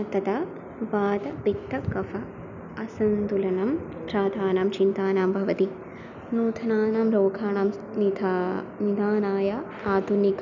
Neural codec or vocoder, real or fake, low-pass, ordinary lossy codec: none; real; 7.2 kHz; none